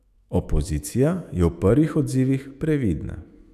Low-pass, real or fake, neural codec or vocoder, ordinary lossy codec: 14.4 kHz; fake; autoencoder, 48 kHz, 128 numbers a frame, DAC-VAE, trained on Japanese speech; none